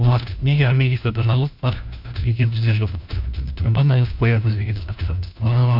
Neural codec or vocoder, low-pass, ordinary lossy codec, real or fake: codec, 16 kHz, 1 kbps, FunCodec, trained on LibriTTS, 50 frames a second; 5.4 kHz; none; fake